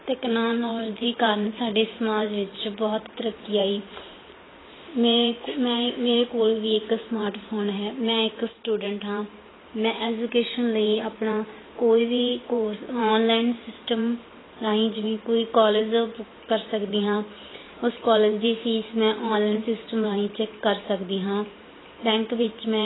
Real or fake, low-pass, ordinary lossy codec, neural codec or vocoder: fake; 7.2 kHz; AAC, 16 kbps; vocoder, 44.1 kHz, 128 mel bands every 512 samples, BigVGAN v2